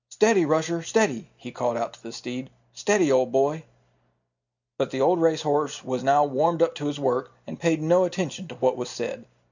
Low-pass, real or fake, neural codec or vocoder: 7.2 kHz; real; none